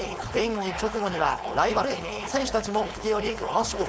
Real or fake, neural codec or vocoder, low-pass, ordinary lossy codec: fake; codec, 16 kHz, 4.8 kbps, FACodec; none; none